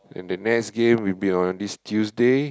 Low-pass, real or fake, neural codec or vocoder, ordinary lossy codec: none; real; none; none